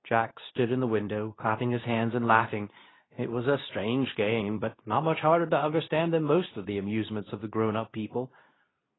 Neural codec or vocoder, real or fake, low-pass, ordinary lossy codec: codec, 24 kHz, 0.9 kbps, WavTokenizer, medium speech release version 2; fake; 7.2 kHz; AAC, 16 kbps